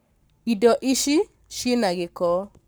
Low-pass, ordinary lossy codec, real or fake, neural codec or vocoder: none; none; fake; codec, 44.1 kHz, 7.8 kbps, Pupu-Codec